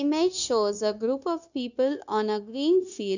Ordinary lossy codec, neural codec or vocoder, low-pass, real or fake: none; codec, 16 kHz, 0.9 kbps, LongCat-Audio-Codec; 7.2 kHz; fake